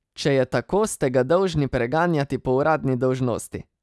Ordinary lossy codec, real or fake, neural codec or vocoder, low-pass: none; real; none; none